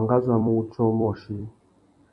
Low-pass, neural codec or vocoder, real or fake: 10.8 kHz; vocoder, 44.1 kHz, 128 mel bands every 256 samples, BigVGAN v2; fake